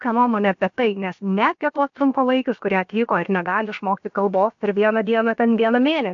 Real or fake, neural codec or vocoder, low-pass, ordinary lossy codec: fake; codec, 16 kHz, about 1 kbps, DyCAST, with the encoder's durations; 7.2 kHz; AAC, 64 kbps